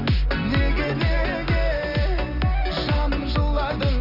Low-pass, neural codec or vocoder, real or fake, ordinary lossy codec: 5.4 kHz; none; real; none